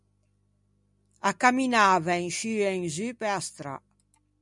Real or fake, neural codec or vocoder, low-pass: real; none; 10.8 kHz